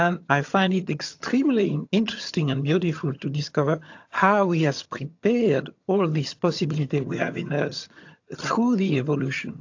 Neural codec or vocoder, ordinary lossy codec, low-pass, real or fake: vocoder, 22.05 kHz, 80 mel bands, HiFi-GAN; AAC, 48 kbps; 7.2 kHz; fake